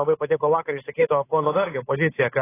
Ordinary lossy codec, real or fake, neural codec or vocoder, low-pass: AAC, 16 kbps; fake; codec, 16 kHz, 8 kbps, FunCodec, trained on Chinese and English, 25 frames a second; 3.6 kHz